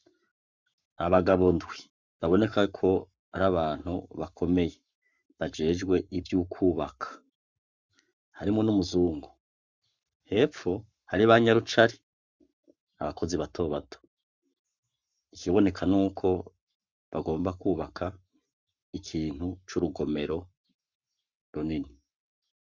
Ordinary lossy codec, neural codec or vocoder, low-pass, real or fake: Opus, 64 kbps; codec, 44.1 kHz, 7.8 kbps, Pupu-Codec; 7.2 kHz; fake